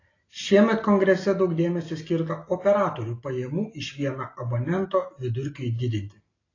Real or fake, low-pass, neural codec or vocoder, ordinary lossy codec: real; 7.2 kHz; none; AAC, 32 kbps